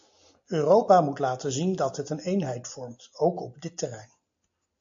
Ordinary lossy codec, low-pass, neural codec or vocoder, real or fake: AAC, 64 kbps; 7.2 kHz; none; real